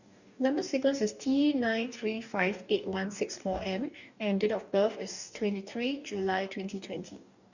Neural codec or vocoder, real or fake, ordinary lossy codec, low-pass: codec, 44.1 kHz, 2.6 kbps, DAC; fake; none; 7.2 kHz